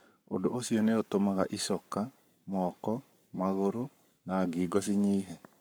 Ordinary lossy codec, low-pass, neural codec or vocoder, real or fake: none; none; codec, 44.1 kHz, 7.8 kbps, Pupu-Codec; fake